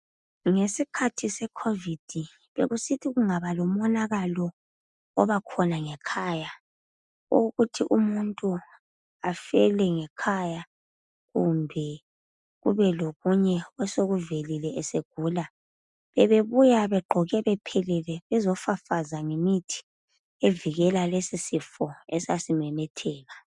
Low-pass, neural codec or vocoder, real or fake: 10.8 kHz; none; real